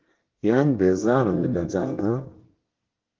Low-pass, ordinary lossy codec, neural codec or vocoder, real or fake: 7.2 kHz; Opus, 16 kbps; codec, 24 kHz, 1 kbps, SNAC; fake